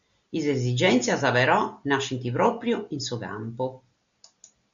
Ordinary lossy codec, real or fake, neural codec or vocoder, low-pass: MP3, 64 kbps; real; none; 7.2 kHz